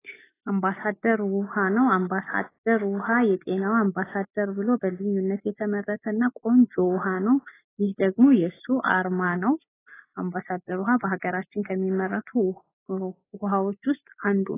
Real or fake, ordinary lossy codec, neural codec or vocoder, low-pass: real; AAC, 16 kbps; none; 3.6 kHz